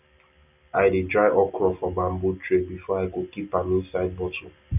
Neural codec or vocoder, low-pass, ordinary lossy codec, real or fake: none; 3.6 kHz; none; real